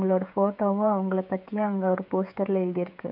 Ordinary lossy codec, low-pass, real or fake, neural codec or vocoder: none; 5.4 kHz; fake; codec, 16 kHz, 16 kbps, FreqCodec, smaller model